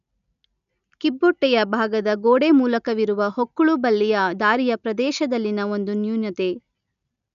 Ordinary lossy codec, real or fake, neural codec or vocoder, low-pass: none; real; none; 7.2 kHz